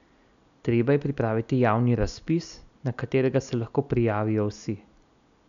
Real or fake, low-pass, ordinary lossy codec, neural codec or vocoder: real; 7.2 kHz; none; none